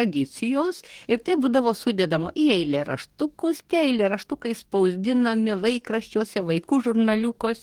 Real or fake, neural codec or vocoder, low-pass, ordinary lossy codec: fake; codec, 44.1 kHz, 2.6 kbps, DAC; 19.8 kHz; Opus, 24 kbps